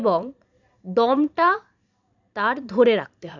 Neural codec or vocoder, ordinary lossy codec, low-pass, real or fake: vocoder, 44.1 kHz, 128 mel bands every 512 samples, BigVGAN v2; none; 7.2 kHz; fake